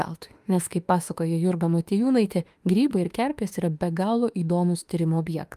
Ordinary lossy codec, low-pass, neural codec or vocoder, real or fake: Opus, 32 kbps; 14.4 kHz; autoencoder, 48 kHz, 32 numbers a frame, DAC-VAE, trained on Japanese speech; fake